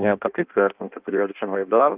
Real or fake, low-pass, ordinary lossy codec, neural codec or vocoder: fake; 3.6 kHz; Opus, 32 kbps; codec, 16 kHz in and 24 kHz out, 0.6 kbps, FireRedTTS-2 codec